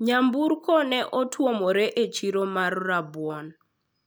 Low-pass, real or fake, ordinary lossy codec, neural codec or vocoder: none; real; none; none